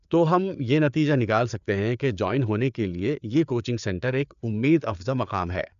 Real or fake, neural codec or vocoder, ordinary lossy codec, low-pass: fake; codec, 16 kHz, 4 kbps, FreqCodec, larger model; none; 7.2 kHz